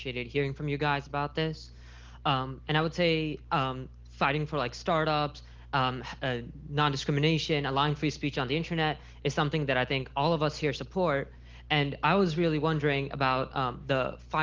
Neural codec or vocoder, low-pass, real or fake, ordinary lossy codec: none; 7.2 kHz; real; Opus, 16 kbps